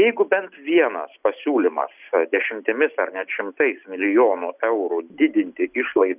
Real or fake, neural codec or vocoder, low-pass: real; none; 3.6 kHz